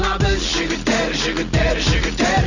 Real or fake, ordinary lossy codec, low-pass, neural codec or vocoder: real; none; 7.2 kHz; none